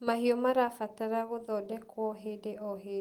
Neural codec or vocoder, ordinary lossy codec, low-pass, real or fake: none; Opus, 24 kbps; 19.8 kHz; real